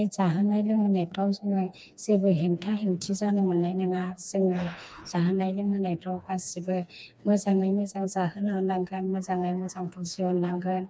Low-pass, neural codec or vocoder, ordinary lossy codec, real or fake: none; codec, 16 kHz, 2 kbps, FreqCodec, smaller model; none; fake